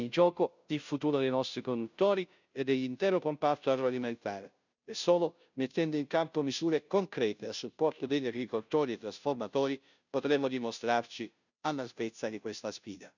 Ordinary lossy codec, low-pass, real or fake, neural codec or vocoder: none; 7.2 kHz; fake; codec, 16 kHz, 0.5 kbps, FunCodec, trained on Chinese and English, 25 frames a second